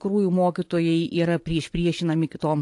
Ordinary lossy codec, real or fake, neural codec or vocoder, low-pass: AAC, 64 kbps; fake; vocoder, 24 kHz, 100 mel bands, Vocos; 10.8 kHz